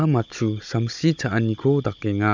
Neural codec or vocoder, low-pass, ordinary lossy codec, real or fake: codec, 16 kHz, 16 kbps, FunCodec, trained on Chinese and English, 50 frames a second; 7.2 kHz; MP3, 64 kbps; fake